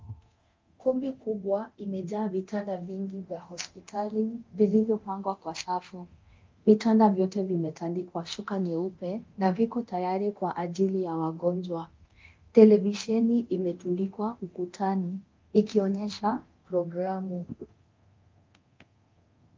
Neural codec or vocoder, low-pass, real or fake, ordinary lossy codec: codec, 24 kHz, 0.9 kbps, DualCodec; 7.2 kHz; fake; Opus, 32 kbps